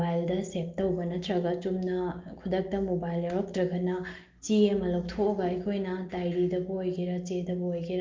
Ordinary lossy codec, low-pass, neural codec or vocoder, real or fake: Opus, 32 kbps; 7.2 kHz; none; real